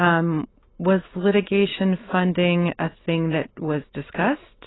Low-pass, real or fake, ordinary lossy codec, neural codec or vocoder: 7.2 kHz; real; AAC, 16 kbps; none